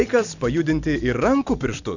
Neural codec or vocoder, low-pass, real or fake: none; 7.2 kHz; real